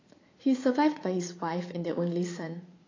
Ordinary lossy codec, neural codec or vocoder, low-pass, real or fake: AAC, 32 kbps; vocoder, 44.1 kHz, 80 mel bands, Vocos; 7.2 kHz; fake